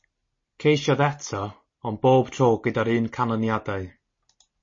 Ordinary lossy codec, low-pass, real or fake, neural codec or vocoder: MP3, 32 kbps; 7.2 kHz; real; none